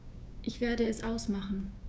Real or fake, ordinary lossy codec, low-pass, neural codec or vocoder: fake; none; none; codec, 16 kHz, 6 kbps, DAC